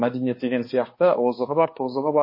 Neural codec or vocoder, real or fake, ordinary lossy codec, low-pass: codec, 16 kHz, 2 kbps, X-Codec, HuBERT features, trained on balanced general audio; fake; MP3, 24 kbps; 5.4 kHz